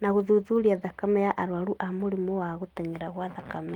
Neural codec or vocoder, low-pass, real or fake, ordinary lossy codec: none; 19.8 kHz; real; Opus, 24 kbps